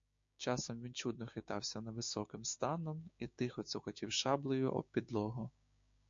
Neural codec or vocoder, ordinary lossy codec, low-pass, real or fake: codec, 16 kHz, 6 kbps, DAC; MP3, 48 kbps; 7.2 kHz; fake